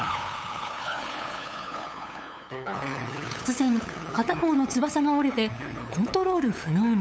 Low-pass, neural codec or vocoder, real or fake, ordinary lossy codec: none; codec, 16 kHz, 8 kbps, FunCodec, trained on LibriTTS, 25 frames a second; fake; none